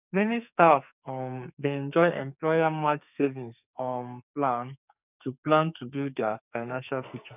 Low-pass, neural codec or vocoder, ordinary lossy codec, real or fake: 3.6 kHz; codec, 44.1 kHz, 2.6 kbps, SNAC; none; fake